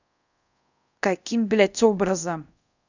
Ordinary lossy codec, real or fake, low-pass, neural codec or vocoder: none; fake; 7.2 kHz; codec, 24 kHz, 0.5 kbps, DualCodec